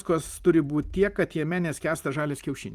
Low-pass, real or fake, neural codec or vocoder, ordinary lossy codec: 14.4 kHz; fake; vocoder, 44.1 kHz, 128 mel bands every 256 samples, BigVGAN v2; Opus, 32 kbps